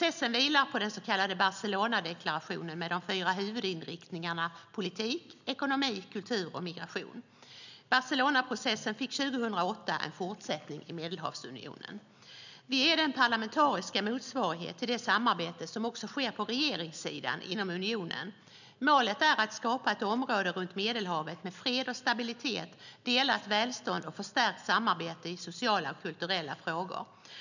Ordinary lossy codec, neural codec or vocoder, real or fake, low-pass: none; none; real; 7.2 kHz